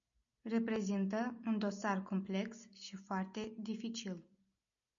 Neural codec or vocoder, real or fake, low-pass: none; real; 7.2 kHz